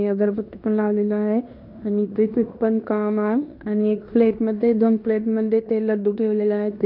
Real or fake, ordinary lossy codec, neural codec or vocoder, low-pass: fake; none; codec, 16 kHz in and 24 kHz out, 0.9 kbps, LongCat-Audio-Codec, fine tuned four codebook decoder; 5.4 kHz